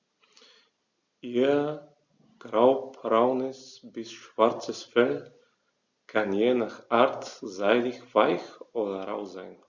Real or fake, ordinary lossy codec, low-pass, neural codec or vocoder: real; Opus, 64 kbps; 7.2 kHz; none